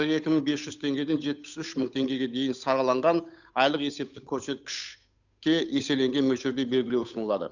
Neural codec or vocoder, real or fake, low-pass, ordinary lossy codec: codec, 16 kHz, 8 kbps, FunCodec, trained on Chinese and English, 25 frames a second; fake; 7.2 kHz; none